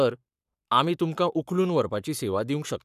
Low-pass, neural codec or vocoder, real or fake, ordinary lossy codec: 14.4 kHz; codec, 44.1 kHz, 7.8 kbps, Pupu-Codec; fake; none